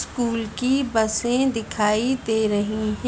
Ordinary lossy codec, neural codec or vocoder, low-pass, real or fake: none; none; none; real